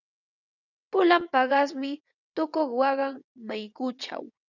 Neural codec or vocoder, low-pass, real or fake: vocoder, 22.05 kHz, 80 mel bands, WaveNeXt; 7.2 kHz; fake